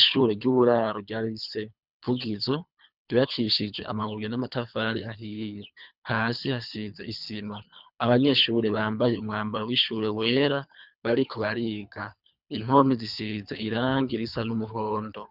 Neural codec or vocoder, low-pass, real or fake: codec, 24 kHz, 3 kbps, HILCodec; 5.4 kHz; fake